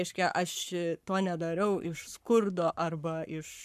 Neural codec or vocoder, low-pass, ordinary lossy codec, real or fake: codec, 44.1 kHz, 7.8 kbps, Pupu-Codec; 14.4 kHz; MP3, 96 kbps; fake